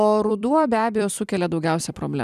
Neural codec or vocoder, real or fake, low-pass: vocoder, 44.1 kHz, 128 mel bands every 256 samples, BigVGAN v2; fake; 14.4 kHz